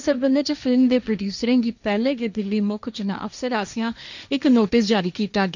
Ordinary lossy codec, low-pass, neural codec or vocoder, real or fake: none; 7.2 kHz; codec, 16 kHz, 1.1 kbps, Voila-Tokenizer; fake